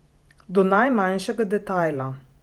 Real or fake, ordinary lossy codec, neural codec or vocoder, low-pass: fake; Opus, 32 kbps; vocoder, 48 kHz, 128 mel bands, Vocos; 19.8 kHz